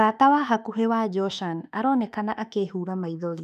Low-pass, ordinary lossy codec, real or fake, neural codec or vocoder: 14.4 kHz; none; fake; autoencoder, 48 kHz, 32 numbers a frame, DAC-VAE, trained on Japanese speech